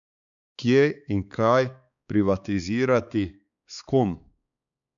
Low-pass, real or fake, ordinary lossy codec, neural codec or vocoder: 7.2 kHz; fake; none; codec, 16 kHz, 4 kbps, X-Codec, HuBERT features, trained on balanced general audio